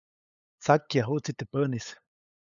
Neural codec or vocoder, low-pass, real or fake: codec, 16 kHz, 8 kbps, FunCodec, trained on LibriTTS, 25 frames a second; 7.2 kHz; fake